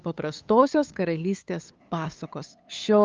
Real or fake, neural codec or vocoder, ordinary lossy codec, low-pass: fake; codec, 16 kHz, 8 kbps, FunCodec, trained on LibriTTS, 25 frames a second; Opus, 24 kbps; 7.2 kHz